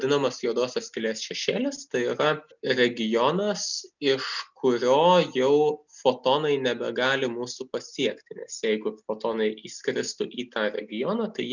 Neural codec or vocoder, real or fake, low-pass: none; real; 7.2 kHz